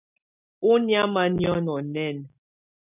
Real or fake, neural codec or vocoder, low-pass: real; none; 3.6 kHz